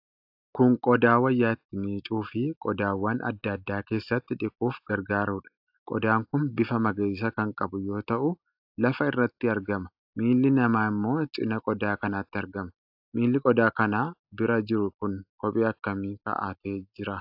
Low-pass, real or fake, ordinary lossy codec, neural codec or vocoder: 5.4 kHz; real; AAC, 48 kbps; none